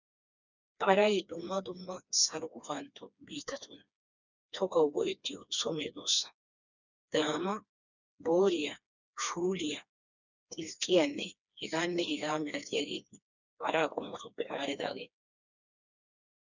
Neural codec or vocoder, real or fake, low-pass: codec, 16 kHz, 2 kbps, FreqCodec, smaller model; fake; 7.2 kHz